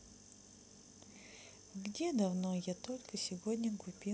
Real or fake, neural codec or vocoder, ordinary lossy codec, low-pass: real; none; none; none